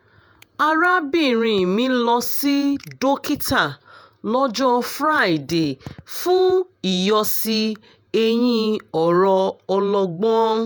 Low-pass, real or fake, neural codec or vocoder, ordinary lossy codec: none; fake; vocoder, 48 kHz, 128 mel bands, Vocos; none